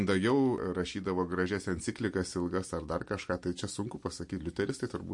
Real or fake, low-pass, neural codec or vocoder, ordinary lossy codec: real; 10.8 kHz; none; MP3, 48 kbps